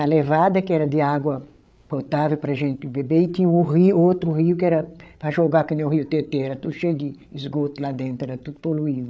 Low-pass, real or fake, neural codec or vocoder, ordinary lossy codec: none; fake; codec, 16 kHz, 8 kbps, FreqCodec, larger model; none